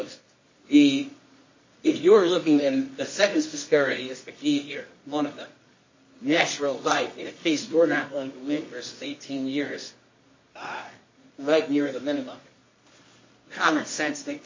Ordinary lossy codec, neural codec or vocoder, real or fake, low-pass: MP3, 32 kbps; codec, 24 kHz, 0.9 kbps, WavTokenizer, medium music audio release; fake; 7.2 kHz